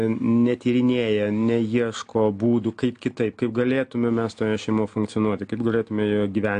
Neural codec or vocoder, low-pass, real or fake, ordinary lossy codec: none; 9.9 kHz; real; AAC, 64 kbps